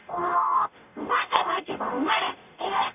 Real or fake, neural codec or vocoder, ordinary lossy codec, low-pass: fake; codec, 44.1 kHz, 0.9 kbps, DAC; none; 3.6 kHz